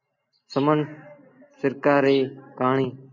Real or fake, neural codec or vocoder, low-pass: real; none; 7.2 kHz